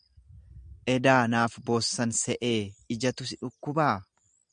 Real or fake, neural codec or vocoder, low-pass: real; none; 9.9 kHz